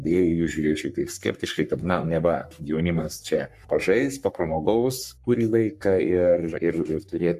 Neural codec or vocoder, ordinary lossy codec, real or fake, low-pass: codec, 44.1 kHz, 3.4 kbps, Pupu-Codec; MP3, 96 kbps; fake; 14.4 kHz